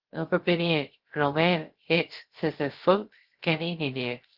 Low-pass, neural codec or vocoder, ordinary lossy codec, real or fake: 5.4 kHz; codec, 16 kHz, 0.3 kbps, FocalCodec; Opus, 16 kbps; fake